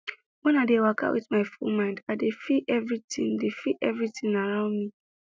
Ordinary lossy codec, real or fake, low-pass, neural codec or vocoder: none; real; none; none